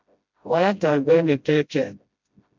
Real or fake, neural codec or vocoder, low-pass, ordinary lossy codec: fake; codec, 16 kHz, 0.5 kbps, FreqCodec, smaller model; 7.2 kHz; MP3, 64 kbps